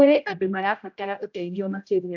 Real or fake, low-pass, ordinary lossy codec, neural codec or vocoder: fake; 7.2 kHz; AAC, 48 kbps; codec, 16 kHz, 0.5 kbps, X-Codec, HuBERT features, trained on general audio